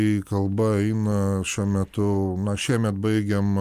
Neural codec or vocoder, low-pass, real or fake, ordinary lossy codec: none; 14.4 kHz; real; Opus, 32 kbps